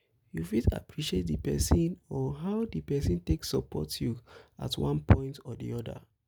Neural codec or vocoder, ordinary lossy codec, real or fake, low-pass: none; none; real; none